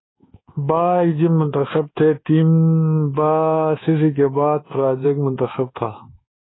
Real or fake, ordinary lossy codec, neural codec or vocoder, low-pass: fake; AAC, 16 kbps; codec, 24 kHz, 1.2 kbps, DualCodec; 7.2 kHz